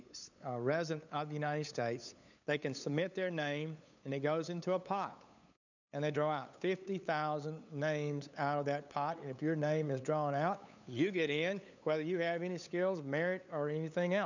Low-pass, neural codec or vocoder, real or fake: 7.2 kHz; codec, 16 kHz, 8 kbps, FunCodec, trained on LibriTTS, 25 frames a second; fake